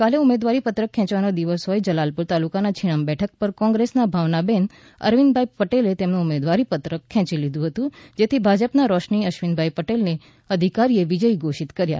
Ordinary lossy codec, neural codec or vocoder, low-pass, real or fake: none; none; none; real